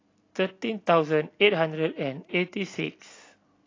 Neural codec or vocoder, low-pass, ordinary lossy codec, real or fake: none; 7.2 kHz; AAC, 32 kbps; real